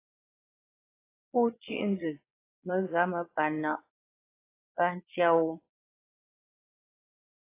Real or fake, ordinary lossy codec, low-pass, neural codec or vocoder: fake; AAC, 24 kbps; 3.6 kHz; vocoder, 22.05 kHz, 80 mel bands, Vocos